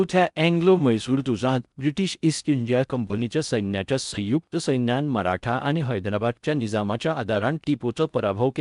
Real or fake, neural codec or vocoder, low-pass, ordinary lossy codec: fake; codec, 16 kHz in and 24 kHz out, 0.6 kbps, FocalCodec, streaming, 4096 codes; 10.8 kHz; none